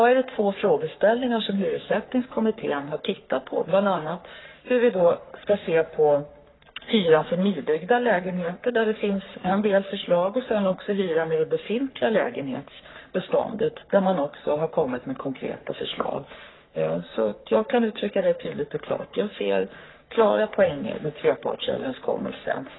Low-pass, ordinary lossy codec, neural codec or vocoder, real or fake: 7.2 kHz; AAC, 16 kbps; codec, 44.1 kHz, 3.4 kbps, Pupu-Codec; fake